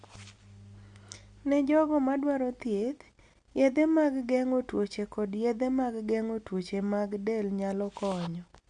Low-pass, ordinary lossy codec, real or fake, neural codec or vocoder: 9.9 kHz; Opus, 64 kbps; real; none